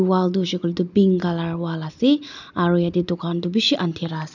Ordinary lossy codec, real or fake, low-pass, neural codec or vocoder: none; real; 7.2 kHz; none